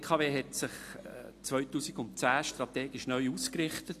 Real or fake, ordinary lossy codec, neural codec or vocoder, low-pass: real; AAC, 64 kbps; none; 14.4 kHz